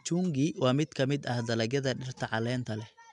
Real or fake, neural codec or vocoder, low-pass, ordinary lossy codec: real; none; 10.8 kHz; MP3, 96 kbps